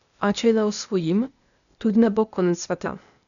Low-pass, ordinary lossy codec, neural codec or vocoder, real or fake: 7.2 kHz; none; codec, 16 kHz, 0.8 kbps, ZipCodec; fake